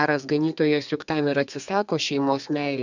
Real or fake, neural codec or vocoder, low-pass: fake; codec, 32 kHz, 1.9 kbps, SNAC; 7.2 kHz